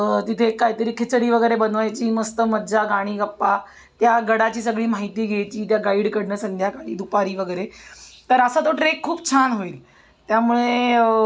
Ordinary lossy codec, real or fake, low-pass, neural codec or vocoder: none; real; none; none